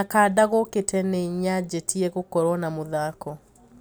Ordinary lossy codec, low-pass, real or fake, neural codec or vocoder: none; none; real; none